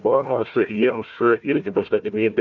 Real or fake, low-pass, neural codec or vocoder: fake; 7.2 kHz; codec, 16 kHz, 1 kbps, FunCodec, trained on Chinese and English, 50 frames a second